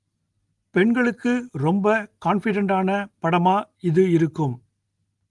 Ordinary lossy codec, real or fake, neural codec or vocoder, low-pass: Opus, 24 kbps; real; none; 10.8 kHz